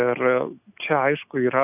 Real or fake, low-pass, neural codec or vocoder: real; 3.6 kHz; none